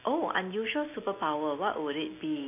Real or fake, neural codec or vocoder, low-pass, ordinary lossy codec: real; none; 3.6 kHz; none